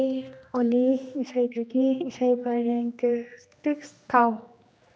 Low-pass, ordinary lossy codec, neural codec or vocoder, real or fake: none; none; codec, 16 kHz, 2 kbps, X-Codec, HuBERT features, trained on general audio; fake